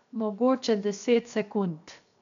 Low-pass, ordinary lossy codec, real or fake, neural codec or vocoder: 7.2 kHz; none; fake; codec, 16 kHz, 0.7 kbps, FocalCodec